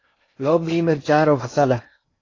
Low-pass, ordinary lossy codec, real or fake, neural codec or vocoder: 7.2 kHz; AAC, 32 kbps; fake; codec, 16 kHz in and 24 kHz out, 0.6 kbps, FocalCodec, streaming, 4096 codes